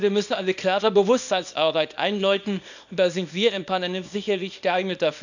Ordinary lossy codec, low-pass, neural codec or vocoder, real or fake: none; 7.2 kHz; codec, 24 kHz, 0.9 kbps, WavTokenizer, small release; fake